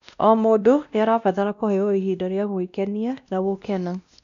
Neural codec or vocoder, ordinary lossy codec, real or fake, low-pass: codec, 16 kHz, 1 kbps, X-Codec, WavLM features, trained on Multilingual LibriSpeech; none; fake; 7.2 kHz